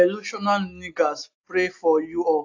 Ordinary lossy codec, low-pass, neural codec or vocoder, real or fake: AAC, 48 kbps; 7.2 kHz; none; real